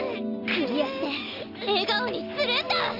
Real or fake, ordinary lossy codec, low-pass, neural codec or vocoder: real; none; 5.4 kHz; none